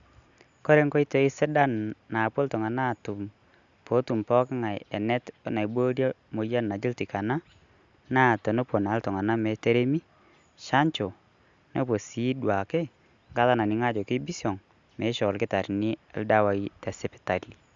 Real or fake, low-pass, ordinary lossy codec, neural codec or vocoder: real; 7.2 kHz; Opus, 64 kbps; none